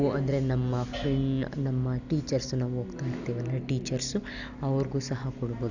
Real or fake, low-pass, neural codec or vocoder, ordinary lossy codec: real; 7.2 kHz; none; none